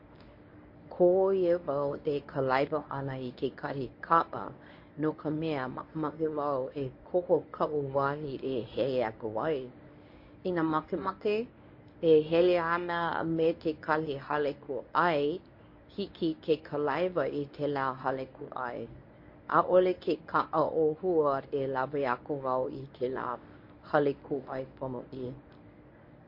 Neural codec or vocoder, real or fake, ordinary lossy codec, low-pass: codec, 24 kHz, 0.9 kbps, WavTokenizer, medium speech release version 1; fake; MP3, 32 kbps; 5.4 kHz